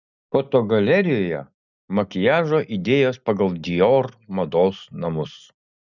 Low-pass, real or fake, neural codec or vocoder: 7.2 kHz; real; none